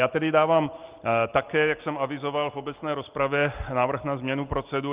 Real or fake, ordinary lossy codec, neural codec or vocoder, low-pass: real; Opus, 32 kbps; none; 3.6 kHz